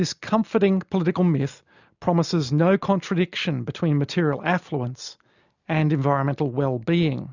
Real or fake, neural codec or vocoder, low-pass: real; none; 7.2 kHz